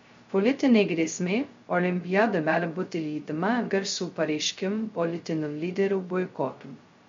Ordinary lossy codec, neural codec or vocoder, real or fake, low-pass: MP3, 48 kbps; codec, 16 kHz, 0.2 kbps, FocalCodec; fake; 7.2 kHz